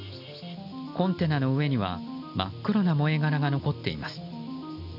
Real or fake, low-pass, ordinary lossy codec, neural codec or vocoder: real; 5.4 kHz; none; none